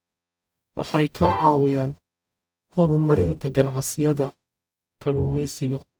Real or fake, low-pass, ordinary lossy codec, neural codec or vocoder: fake; none; none; codec, 44.1 kHz, 0.9 kbps, DAC